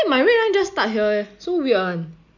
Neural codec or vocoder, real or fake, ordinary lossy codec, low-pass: none; real; none; 7.2 kHz